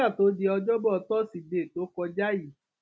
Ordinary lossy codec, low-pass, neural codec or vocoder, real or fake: none; none; none; real